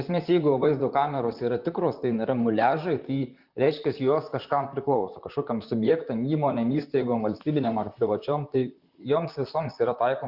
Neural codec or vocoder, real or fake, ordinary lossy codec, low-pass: vocoder, 44.1 kHz, 128 mel bands, Pupu-Vocoder; fake; Opus, 64 kbps; 5.4 kHz